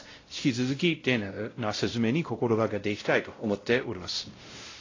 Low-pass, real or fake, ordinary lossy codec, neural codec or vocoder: 7.2 kHz; fake; AAC, 32 kbps; codec, 16 kHz, 0.5 kbps, X-Codec, WavLM features, trained on Multilingual LibriSpeech